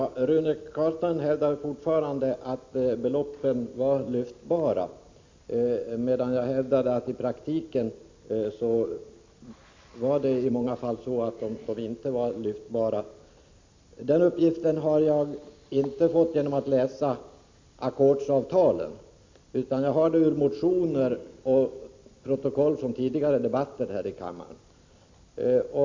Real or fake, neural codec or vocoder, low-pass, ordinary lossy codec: real; none; 7.2 kHz; MP3, 48 kbps